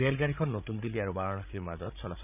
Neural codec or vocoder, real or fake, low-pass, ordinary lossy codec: codec, 16 kHz, 16 kbps, FreqCodec, larger model; fake; 3.6 kHz; none